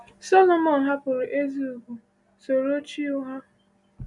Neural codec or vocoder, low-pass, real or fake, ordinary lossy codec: none; 10.8 kHz; real; none